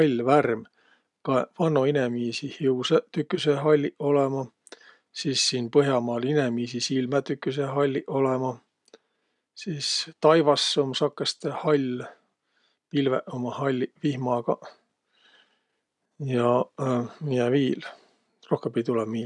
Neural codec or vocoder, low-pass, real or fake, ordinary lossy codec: none; 10.8 kHz; real; none